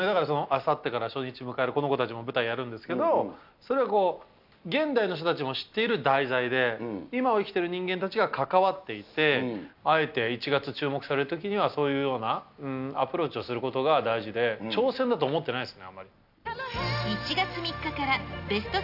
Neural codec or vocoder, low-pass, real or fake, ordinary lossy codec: none; 5.4 kHz; real; none